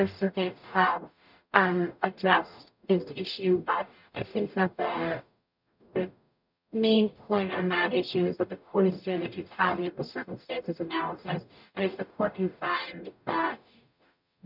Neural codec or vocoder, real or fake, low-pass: codec, 44.1 kHz, 0.9 kbps, DAC; fake; 5.4 kHz